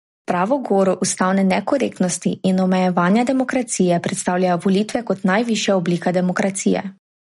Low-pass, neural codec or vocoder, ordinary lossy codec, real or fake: 14.4 kHz; none; MP3, 48 kbps; real